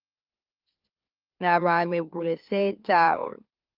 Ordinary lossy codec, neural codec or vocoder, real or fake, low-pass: Opus, 24 kbps; autoencoder, 44.1 kHz, a latent of 192 numbers a frame, MeloTTS; fake; 5.4 kHz